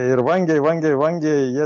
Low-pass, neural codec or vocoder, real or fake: 7.2 kHz; none; real